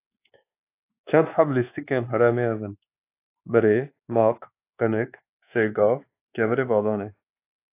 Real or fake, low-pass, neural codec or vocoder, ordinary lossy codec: fake; 3.6 kHz; codec, 24 kHz, 1.2 kbps, DualCodec; AAC, 24 kbps